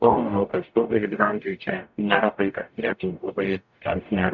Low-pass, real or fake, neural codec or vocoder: 7.2 kHz; fake; codec, 44.1 kHz, 0.9 kbps, DAC